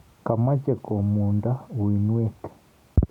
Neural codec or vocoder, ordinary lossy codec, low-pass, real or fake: none; none; 19.8 kHz; real